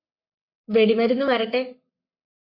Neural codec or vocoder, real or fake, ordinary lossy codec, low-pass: codec, 44.1 kHz, 7.8 kbps, Pupu-Codec; fake; MP3, 32 kbps; 5.4 kHz